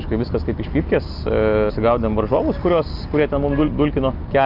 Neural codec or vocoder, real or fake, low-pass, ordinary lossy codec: none; real; 5.4 kHz; Opus, 24 kbps